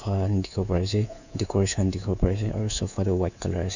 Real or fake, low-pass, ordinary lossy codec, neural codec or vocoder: fake; 7.2 kHz; none; codec, 24 kHz, 3.1 kbps, DualCodec